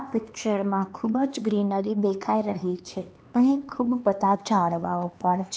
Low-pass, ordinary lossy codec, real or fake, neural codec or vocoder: none; none; fake; codec, 16 kHz, 2 kbps, X-Codec, HuBERT features, trained on LibriSpeech